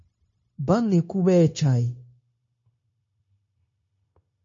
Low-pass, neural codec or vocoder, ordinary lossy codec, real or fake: 7.2 kHz; codec, 16 kHz, 0.9 kbps, LongCat-Audio-Codec; MP3, 32 kbps; fake